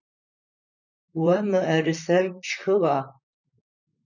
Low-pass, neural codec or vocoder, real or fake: 7.2 kHz; vocoder, 44.1 kHz, 128 mel bands, Pupu-Vocoder; fake